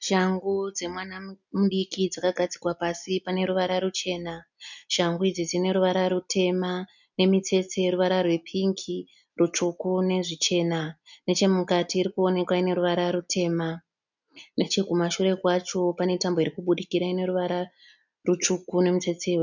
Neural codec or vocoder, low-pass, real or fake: none; 7.2 kHz; real